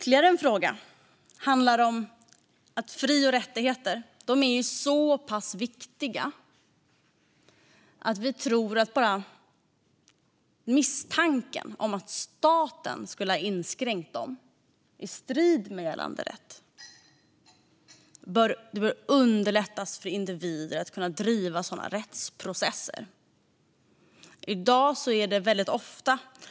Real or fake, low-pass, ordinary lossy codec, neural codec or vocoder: real; none; none; none